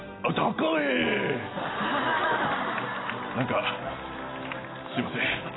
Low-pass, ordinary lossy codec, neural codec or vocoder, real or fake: 7.2 kHz; AAC, 16 kbps; none; real